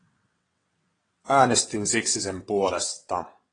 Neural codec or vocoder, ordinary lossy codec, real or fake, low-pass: vocoder, 22.05 kHz, 80 mel bands, WaveNeXt; AAC, 32 kbps; fake; 9.9 kHz